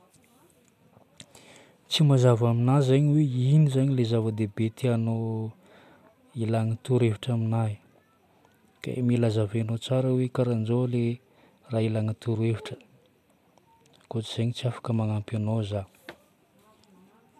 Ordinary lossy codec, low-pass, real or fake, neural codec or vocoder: none; 14.4 kHz; real; none